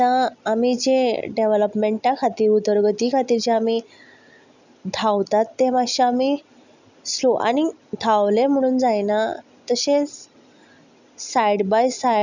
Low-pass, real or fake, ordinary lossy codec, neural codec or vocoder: 7.2 kHz; real; none; none